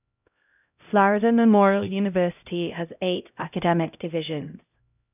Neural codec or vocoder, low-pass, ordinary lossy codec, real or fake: codec, 16 kHz, 0.5 kbps, X-Codec, HuBERT features, trained on LibriSpeech; 3.6 kHz; none; fake